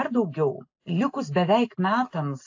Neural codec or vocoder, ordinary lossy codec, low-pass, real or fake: none; AAC, 48 kbps; 7.2 kHz; real